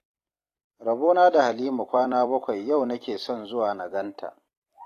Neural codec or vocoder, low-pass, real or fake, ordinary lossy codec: vocoder, 44.1 kHz, 128 mel bands every 256 samples, BigVGAN v2; 14.4 kHz; fake; AAC, 48 kbps